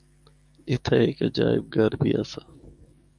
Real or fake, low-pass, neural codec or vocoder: fake; 9.9 kHz; codec, 44.1 kHz, 7.8 kbps, DAC